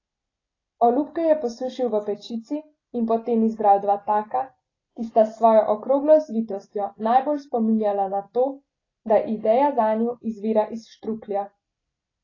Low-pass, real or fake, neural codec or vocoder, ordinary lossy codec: 7.2 kHz; real; none; AAC, 32 kbps